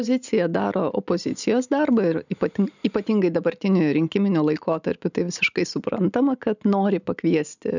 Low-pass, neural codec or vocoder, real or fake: 7.2 kHz; none; real